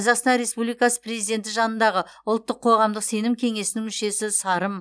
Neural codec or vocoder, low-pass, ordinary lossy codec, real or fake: none; none; none; real